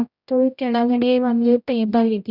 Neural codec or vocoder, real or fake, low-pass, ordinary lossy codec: codec, 16 kHz, 0.5 kbps, X-Codec, HuBERT features, trained on general audio; fake; 5.4 kHz; none